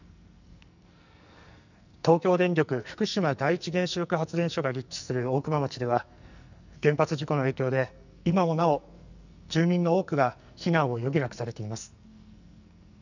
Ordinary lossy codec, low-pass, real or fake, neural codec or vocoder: none; 7.2 kHz; fake; codec, 44.1 kHz, 2.6 kbps, SNAC